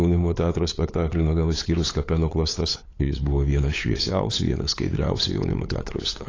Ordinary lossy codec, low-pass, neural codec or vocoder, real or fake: AAC, 32 kbps; 7.2 kHz; codec, 16 kHz, 8 kbps, FunCodec, trained on LibriTTS, 25 frames a second; fake